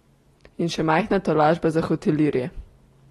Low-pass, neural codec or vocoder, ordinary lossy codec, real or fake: 19.8 kHz; none; AAC, 32 kbps; real